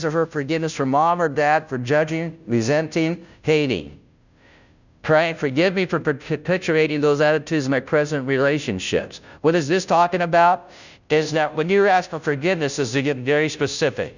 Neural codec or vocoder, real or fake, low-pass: codec, 16 kHz, 0.5 kbps, FunCodec, trained on Chinese and English, 25 frames a second; fake; 7.2 kHz